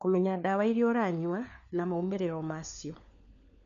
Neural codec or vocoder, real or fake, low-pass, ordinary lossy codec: codec, 16 kHz, 4 kbps, FunCodec, trained on LibriTTS, 50 frames a second; fake; 7.2 kHz; none